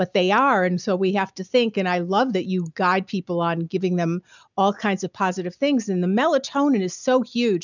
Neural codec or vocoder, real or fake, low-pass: none; real; 7.2 kHz